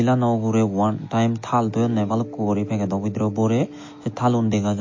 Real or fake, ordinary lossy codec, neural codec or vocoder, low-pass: real; MP3, 32 kbps; none; 7.2 kHz